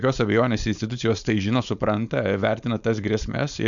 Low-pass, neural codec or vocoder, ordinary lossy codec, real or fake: 7.2 kHz; codec, 16 kHz, 4.8 kbps, FACodec; MP3, 96 kbps; fake